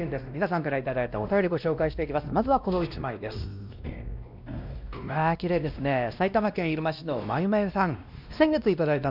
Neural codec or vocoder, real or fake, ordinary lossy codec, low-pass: codec, 16 kHz, 1 kbps, X-Codec, WavLM features, trained on Multilingual LibriSpeech; fake; none; 5.4 kHz